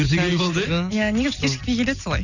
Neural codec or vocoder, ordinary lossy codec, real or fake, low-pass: none; none; real; 7.2 kHz